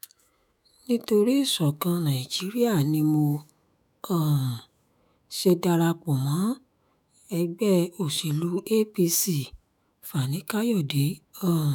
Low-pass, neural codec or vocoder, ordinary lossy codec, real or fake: none; autoencoder, 48 kHz, 128 numbers a frame, DAC-VAE, trained on Japanese speech; none; fake